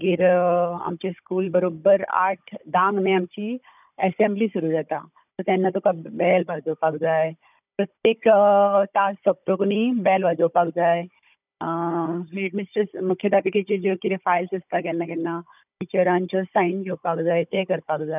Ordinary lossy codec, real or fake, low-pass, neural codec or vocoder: none; fake; 3.6 kHz; codec, 16 kHz, 16 kbps, FunCodec, trained on Chinese and English, 50 frames a second